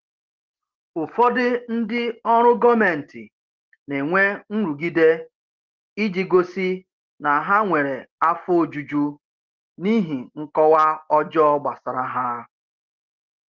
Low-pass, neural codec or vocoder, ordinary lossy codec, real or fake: 7.2 kHz; none; Opus, 16 kbps; real